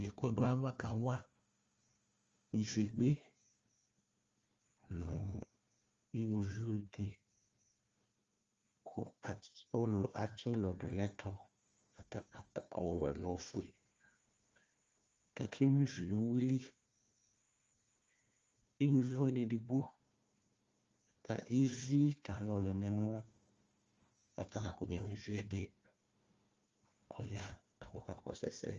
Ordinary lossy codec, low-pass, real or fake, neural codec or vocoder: Opus, 32 kbps; 7.2 kHz; fake; codec, 16 kHz, 1 kbps, FunCodec, trained on Chinese and English, 50 frames a second